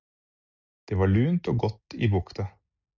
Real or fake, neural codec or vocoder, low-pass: real; none; 7.2 kHz